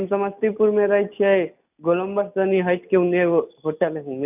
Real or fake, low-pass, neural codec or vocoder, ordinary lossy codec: real; 3.6 kHz; none; none